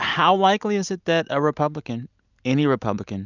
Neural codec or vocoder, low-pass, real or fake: none; 7.2 kHz; real